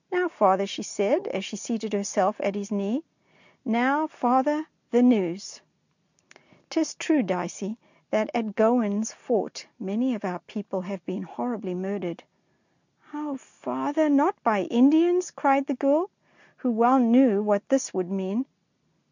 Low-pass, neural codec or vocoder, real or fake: 7.2 kHz; none; real